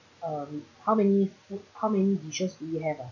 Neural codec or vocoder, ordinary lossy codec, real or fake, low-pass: none; none; real; 7.2 kHz